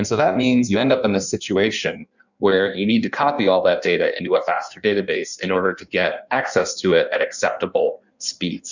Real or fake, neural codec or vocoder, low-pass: fake; codec, 16 kHz in and 24 kHz out, 1.1 kbps, FireRedTTS-2 codec; 7.2 kHz